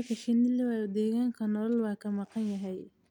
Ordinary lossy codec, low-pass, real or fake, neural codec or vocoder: none; 19.8 kHz; real; none